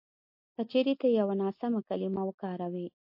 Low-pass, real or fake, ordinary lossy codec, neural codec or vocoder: 5.4 kHz; real; MP3, 32 kbps; none